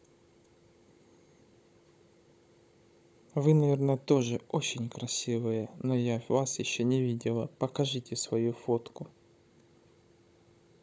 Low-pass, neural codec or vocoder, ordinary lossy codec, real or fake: none; codec, 16 kHz, 16 kbps, FunCodec, trained on Chinese and English, 50 frames a second; none; fake